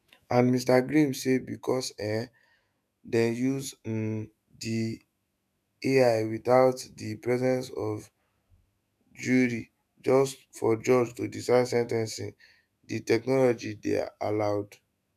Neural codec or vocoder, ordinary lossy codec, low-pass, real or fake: autoencoder, 48 kHz, 128 numbers a frame, DAC-VAE, trained on Japanese speech; none; 14.4 kHz; fake